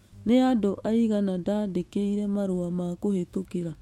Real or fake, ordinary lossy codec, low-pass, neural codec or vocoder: fake; MP3, 64 kbps; 19.8 kHz; autoencoder, 48 kHz, 128 numbers a frame, DAC-VAE, trained on Japanese speech